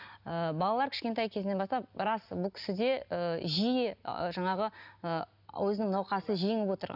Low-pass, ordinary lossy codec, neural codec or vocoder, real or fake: 5.4 kHz; none; none; real